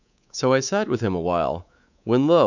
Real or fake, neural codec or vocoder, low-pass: fake; codec, 24 kHz, 3.1 kbps, DualCodec; 7.2 kHz